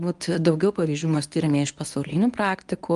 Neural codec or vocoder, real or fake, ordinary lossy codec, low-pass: codec, 24 kHz, 0.9 kbps, WavTokenizer, medium speech release version 1; fake; Opus, 32 kbps; 10.8 kHz